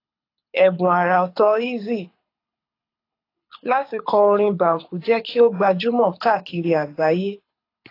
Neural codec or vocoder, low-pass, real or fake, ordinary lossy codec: codec, 24 kHz, 6 kbps, HILCodec; 5.4 kHz; fake; AAC, 32 kbps